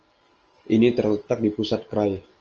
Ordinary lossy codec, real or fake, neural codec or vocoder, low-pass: Opus, 24 kbps; real; none; 7.2 kHz